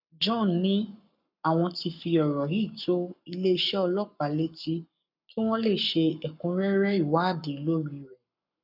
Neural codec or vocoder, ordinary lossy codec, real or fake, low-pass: codec, 44.1 kHz, 7.8 kbps, Pupu-Codec; none; fake; 5.4 kHz